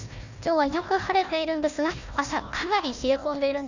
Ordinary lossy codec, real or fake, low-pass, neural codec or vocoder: none; fake; 7.2 kHz; codec, 16 kHz, 1 kbps, FunCodec, trained on Chinese and English, 50 frames a second